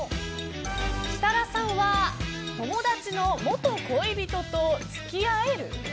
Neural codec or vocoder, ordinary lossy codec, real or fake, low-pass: none; none; real; none